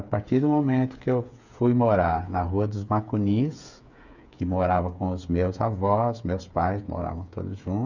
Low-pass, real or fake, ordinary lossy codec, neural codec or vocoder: 7.2 kHz; fake; none; codec, 16 kHz, 8 kbps, FreqCodec, smaller model